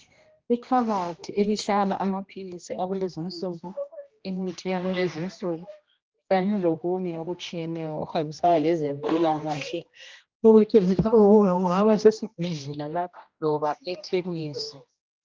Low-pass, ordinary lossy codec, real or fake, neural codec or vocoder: 7.2 kHz; Opus, 24 kbps; fake; codec, 16 kHz, 1 kbps, X-Codec, HuBERT features, trained on general audio